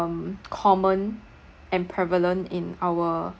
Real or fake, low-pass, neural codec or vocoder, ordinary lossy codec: real; none; none; none